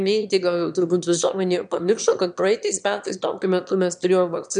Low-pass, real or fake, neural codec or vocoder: 9.9 kHz; fake; autoencoder, 22.05 kHz, a latent of 192 numbers a frame, VITS, trained on one speaker